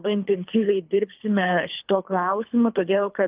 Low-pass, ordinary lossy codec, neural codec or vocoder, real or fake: 3.6 kHz; Opus, 24 kbps; codec, 24 kHz, 3 kbps, HILCodec; fake